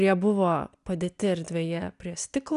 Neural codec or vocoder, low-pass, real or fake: none; 10.8 kHz; real